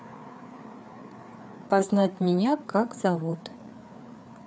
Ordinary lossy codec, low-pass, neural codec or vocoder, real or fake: none; none; codec, 16 kHz, 4 kbps, FreqCodec, larger model; fake